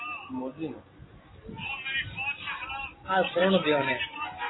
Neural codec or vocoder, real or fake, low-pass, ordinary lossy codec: none; real; 7.2 kHz; AAC, 16 kbps